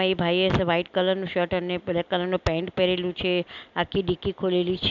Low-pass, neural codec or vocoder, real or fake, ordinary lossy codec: 7.2 kHz; none; real; none